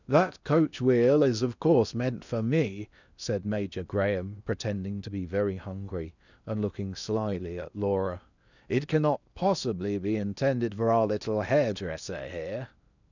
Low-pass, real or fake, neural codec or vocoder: 7.2 kHz; fake; codec, 16 kHz in and 24 kHz out, 0.8 kbps, FocalCodec, streaming, 65536 codes